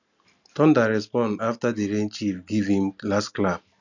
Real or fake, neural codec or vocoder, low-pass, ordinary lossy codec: real; none; 7.2 kHz; none